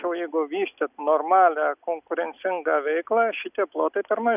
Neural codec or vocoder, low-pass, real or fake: none; 3.6 kHz; real